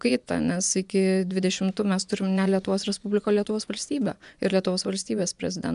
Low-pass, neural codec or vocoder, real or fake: 10.8 kHz; vocoder, 24 kHz, 100 mel bands, Vocos; fake